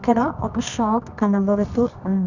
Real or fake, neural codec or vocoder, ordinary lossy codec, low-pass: fake; codec, 24 kHz, 0.9 kbps, WavTokenizer, medium music audio release; none; 7.2 kHz